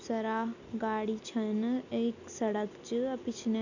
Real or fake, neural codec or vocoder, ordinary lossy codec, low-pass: real; none; none; 7.2 kHz